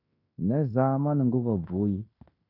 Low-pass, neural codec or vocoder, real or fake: 5.4 kHz; codec, 16 kHz in and 24 kHz out, 0.9 kbps, LongCat-Audio-Codec, fine tuned four codebook decoder; fake